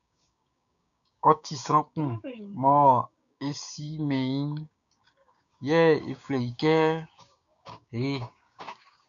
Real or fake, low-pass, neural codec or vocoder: fake; 7.2 kHz; codec, 16 kHz, 6 kbps, DAC